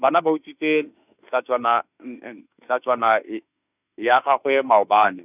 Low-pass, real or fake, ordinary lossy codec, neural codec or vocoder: 3.6 kHz; fake; none; autoencoder, 48 kHz, 32 numbers a frame, DAC-VAE, trained on Japanese speech